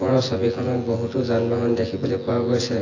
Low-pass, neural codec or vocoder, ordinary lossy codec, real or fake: 7.2 kHz; vocoder, 24 kHz, 100 mel bands, Vocos; AAC, 32 kbps; fake